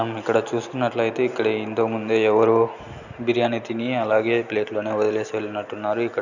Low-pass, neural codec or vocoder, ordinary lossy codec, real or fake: 7.2 kHz; none; none; real